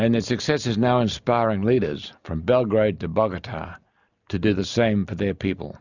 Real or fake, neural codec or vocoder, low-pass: real; none; 7.2 kHz